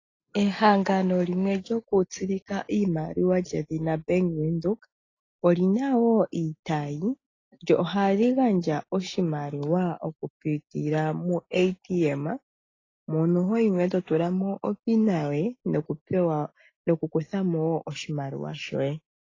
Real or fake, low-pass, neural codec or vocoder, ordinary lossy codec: real; 7.2 kHz; none; AAC, 32 kbps